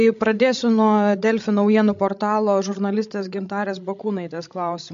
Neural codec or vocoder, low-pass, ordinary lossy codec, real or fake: codec, 16 kHz, 16 kbps, FreqCodec, larger model; 7.2 kHz; MP3, 48 kbps; fake